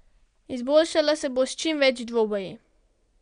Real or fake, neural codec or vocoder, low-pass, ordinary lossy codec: real; none; 9.9 kHz; MP3, 96 kbps